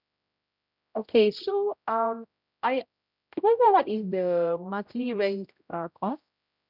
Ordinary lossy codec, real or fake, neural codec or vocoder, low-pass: none; fake; codec, 16 kHz, 0.5 kbps, X-Codec, HuBERT features, trained on general audio; 5.4 kHz